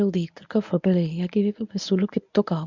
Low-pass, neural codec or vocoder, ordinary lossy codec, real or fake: 7.2 kHz; codec, 24 kHz, 0.9 kbps, WavTokenizer, medium speech release version 1; none; fake